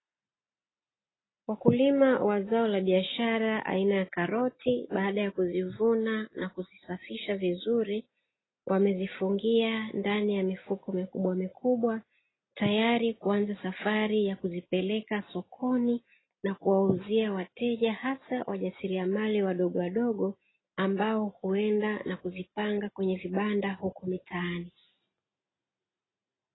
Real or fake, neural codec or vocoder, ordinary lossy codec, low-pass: real; none; AAC, 16 kbps; 7.2 kHz